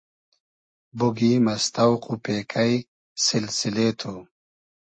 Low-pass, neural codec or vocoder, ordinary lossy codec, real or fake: 9.9 kHz; none; MP3, 32 kbps; real